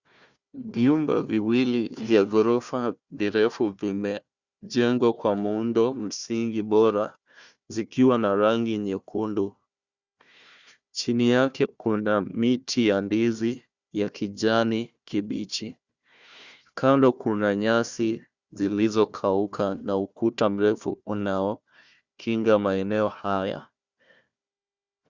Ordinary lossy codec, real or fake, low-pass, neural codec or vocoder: Opus, 64 kbps; fake; 7.2 kHz; codec, 16 kHz, 1 kbps, FunCodec, trained on Chinese and English, 50 frames a second